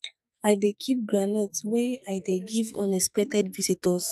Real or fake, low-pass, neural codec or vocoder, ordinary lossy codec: fake; 14.4 kHz; codec, 44.1 kHz, 2.6 kbps, SNAC; none